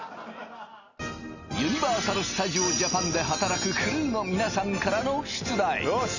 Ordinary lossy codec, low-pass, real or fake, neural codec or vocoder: none; 7.2 kHz; real; none